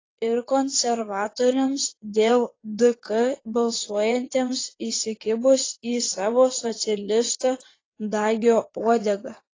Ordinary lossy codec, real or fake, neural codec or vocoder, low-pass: AAC, 32 kbps; fake; vocoder, 22.05 kHz, 80 mel bands, WaveNeXt; 7.2 kHz